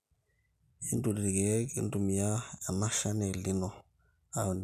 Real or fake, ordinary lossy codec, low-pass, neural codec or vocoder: real; none; 14.4 kHz; none